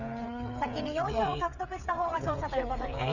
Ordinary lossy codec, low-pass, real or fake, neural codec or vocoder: none; 7.2 kHz; fake; codec, 16 kHz, 8 kbps, FreqCodec, smaller model